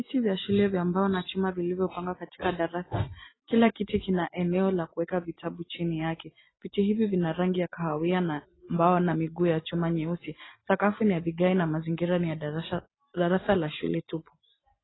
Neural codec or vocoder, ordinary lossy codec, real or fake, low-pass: none; AAC, 16 kbps; real; 7.2 kHz